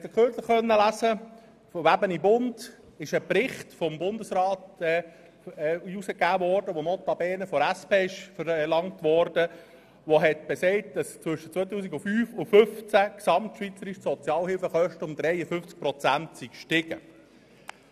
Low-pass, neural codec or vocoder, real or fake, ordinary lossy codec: 14.4 kHz; none; real; none